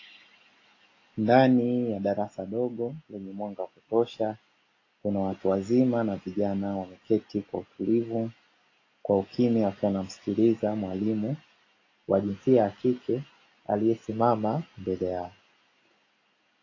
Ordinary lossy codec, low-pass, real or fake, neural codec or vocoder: AAC, 32 kbps; 7.2 kHz; real; none